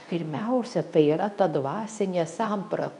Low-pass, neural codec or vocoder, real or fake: 10.8 kHz; codec, 24 kHz, 0.9 kbps, WavTokenizer, medium speech release version 2; fake